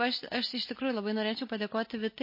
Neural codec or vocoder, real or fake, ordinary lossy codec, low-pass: none; real; MP3, 24 kbps; 5.4 kHz